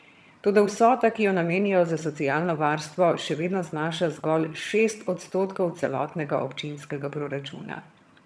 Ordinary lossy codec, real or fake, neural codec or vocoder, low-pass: none; fake; vocoder, 22.05 kHz, 80 mel bands, HiFi-GAN; none